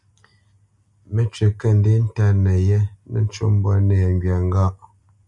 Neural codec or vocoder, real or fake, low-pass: none; real; 10.8 kHz